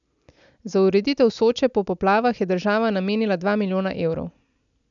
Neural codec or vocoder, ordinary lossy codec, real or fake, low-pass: none; none; real; 7.2 kHz